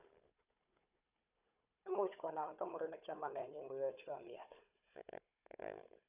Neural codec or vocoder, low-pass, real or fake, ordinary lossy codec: codec, 16 kHz, 4 kbps, FunCodec, trained on Chinese and English, 50 frames a second; 3.6 kHz; fake; none